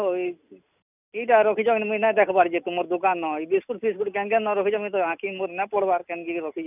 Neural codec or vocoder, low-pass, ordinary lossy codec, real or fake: none; 3.6 kHz; none; real